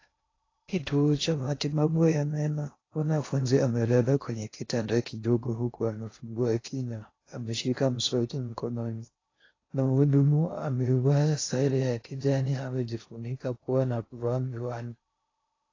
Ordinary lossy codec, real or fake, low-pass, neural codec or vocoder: AAC, 32 kbps; fake; 7.2 kHz; codec, 16 kHz in and 24 kHz out, 0.6 kbps, FocalCodec, streaming, 2048 codes